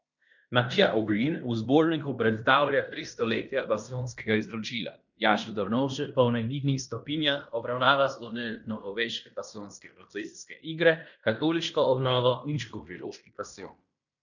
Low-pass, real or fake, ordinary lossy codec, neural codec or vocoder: 7.2 kHz; fake; none; codec, 16 kHz in and 24 kHz out, 0.9 kbps, LongCat-Audio-Codec, fine tuned four codebook decoder